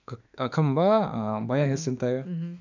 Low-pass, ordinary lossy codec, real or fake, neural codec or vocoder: 7.2 kHz; none; fake; autoencoder, 48 kHz, 32 numbers a frame, DAC-VAE, trained on Japanese speech